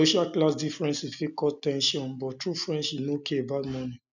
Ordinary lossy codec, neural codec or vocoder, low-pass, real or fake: none; none; 7.2 kHz; real